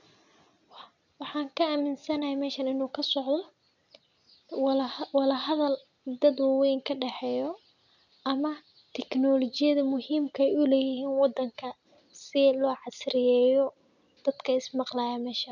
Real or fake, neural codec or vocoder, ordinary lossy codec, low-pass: real; none; none; 7.2 kHz